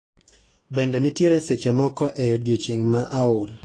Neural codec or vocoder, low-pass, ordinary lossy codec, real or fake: codec, 44.1 kHz, 2.6 kbps, DAC; 9.9 kHz; AAC, 32 kbps; fake